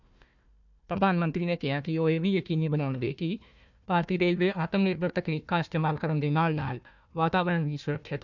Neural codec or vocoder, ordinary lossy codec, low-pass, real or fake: codec, 16 kHz, 1 kbps, FunCodec, trained on Chinese and English, 50 frames a second; none; 7.2 kHz; fake